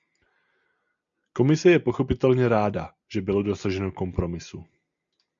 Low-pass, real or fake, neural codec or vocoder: 7.2 kHz; real; none